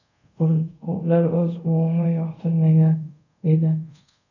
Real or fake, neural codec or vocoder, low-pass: fake; codec, 24 kHz, 0.5 kbps, DualCodec; 7.2 kHz